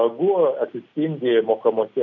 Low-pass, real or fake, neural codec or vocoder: 7.2 kHz; real; none